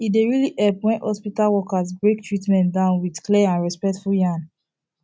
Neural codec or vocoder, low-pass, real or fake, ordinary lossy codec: none; none; real; none